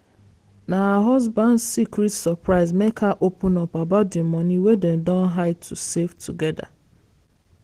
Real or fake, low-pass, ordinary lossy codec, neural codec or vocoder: real; 14.4 kHz; Opus, 16 kbps; none